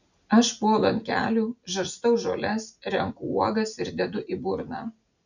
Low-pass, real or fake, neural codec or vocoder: 7.2 kHz; real; none